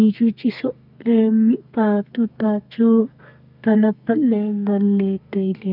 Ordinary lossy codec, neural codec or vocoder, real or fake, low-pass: none; codec, 32 kHz, 1.9 kbps, SNAC; fake; 5.4 kHz